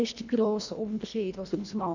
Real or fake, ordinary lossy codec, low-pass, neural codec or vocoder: fake; none; 7.2 kHz; codec, 24 kHz, 1.5 kbps, HILCodec